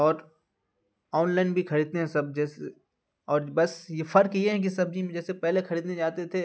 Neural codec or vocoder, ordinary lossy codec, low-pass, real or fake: none; none; none; real